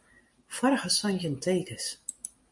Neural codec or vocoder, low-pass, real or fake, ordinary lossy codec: none; 10.8 kHz; real; MP3, 48 kbps